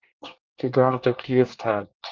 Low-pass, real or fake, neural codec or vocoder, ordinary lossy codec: 7.2 kHz; fake; codec, 24 kHz, 1 kbps, SNAC; Opus, 32 kbps